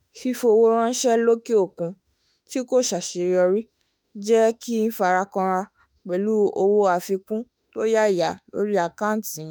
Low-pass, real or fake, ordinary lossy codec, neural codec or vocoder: none; fake; none; autoencoder, 48 kHz, 32 numbers a frame, DAC-VAE, trained on Japanese speech